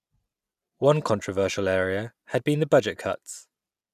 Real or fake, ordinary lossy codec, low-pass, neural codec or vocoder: real; none; 14.4 kHz; none